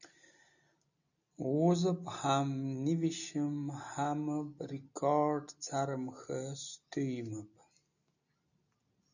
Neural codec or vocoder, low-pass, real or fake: none; 7.2 kHz; real